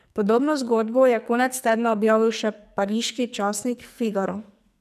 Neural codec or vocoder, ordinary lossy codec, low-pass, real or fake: codec, 44.1 kHz, 2.6 kbps, SNAC; none; 14.4 kHz; fake